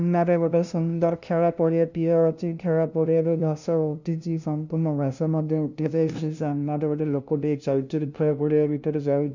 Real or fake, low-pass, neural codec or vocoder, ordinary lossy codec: fake; 7.2 kHz; codec, 16 kHz, 0.5 kbps, FunCodec, trained on LibriTTS, 25 frames a second; none